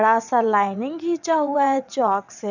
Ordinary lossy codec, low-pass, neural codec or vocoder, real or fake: none; 7.2 kHz; vocoder, 22.05 kHz, 80 mel bands, WaveNeXt; fake